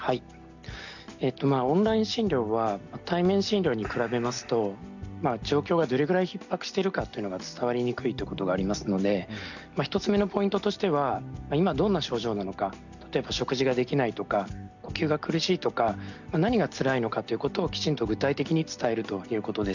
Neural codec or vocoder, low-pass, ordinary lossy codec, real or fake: none; 7.2 kHz; none; real